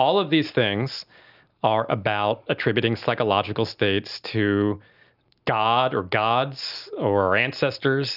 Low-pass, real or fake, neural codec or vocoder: 5.4 kHz; real; none